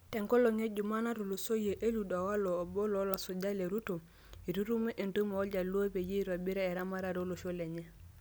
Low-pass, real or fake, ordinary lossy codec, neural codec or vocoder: none; real; none; none